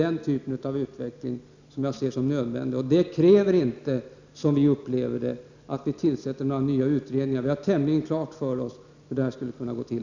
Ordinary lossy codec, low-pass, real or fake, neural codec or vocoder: none; 7.2 kHz; real; none